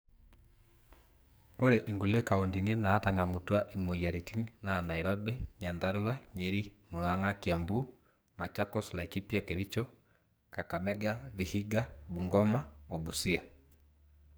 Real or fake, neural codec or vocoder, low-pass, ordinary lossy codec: fake; codec, 44.1 kHz, 2.6 kbps, SNAC; none; none